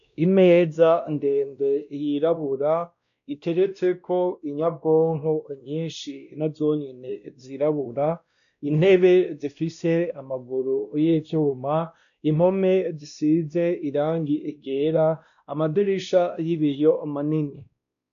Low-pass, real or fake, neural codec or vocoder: 7.2 kHz; fake; codec, 16 kHz, 1 kbps, X-Codec, WavLM features, trained on Multilingual LibriSpeech